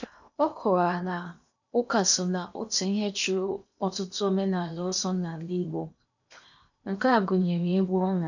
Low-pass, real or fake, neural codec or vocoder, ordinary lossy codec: 7.2 kHz; fake; codec, 16 kHz in and 24 kHz out, 0.8 kbps, FocalCodec, streaming, 65536 codes; none